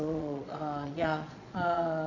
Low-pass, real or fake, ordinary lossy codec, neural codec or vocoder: 7.2 kHz; fake; none; vocoder, 22.05 kHz, 80 mel bands, Vocos